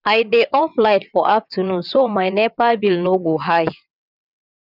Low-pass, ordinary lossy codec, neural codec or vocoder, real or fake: 5.4 kHz; none; vocoder, 22.05 kHz, 80 mel bands, WaveNeXt; fake